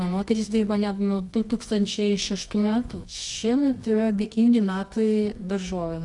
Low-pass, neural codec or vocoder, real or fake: 10.8 kHz; codec, 24 kHz, 0.9 kbps, WavTokenizer, medium music audio release; fake